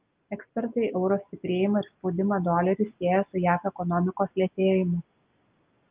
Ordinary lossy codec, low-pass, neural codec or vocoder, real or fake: Opus, 24 kbps; 3.6 kHz; none; real